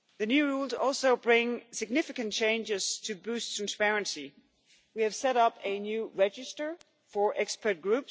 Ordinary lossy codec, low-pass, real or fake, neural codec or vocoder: none; none; real; none